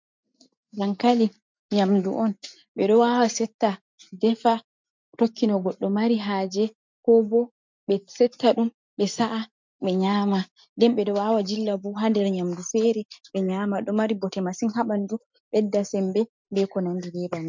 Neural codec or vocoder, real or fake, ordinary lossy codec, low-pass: none; real; MP3, 64 kbps; 7.2 kHz